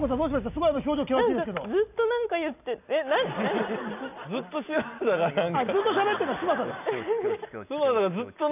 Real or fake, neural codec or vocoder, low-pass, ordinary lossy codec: real; none; 3.6 kHz; none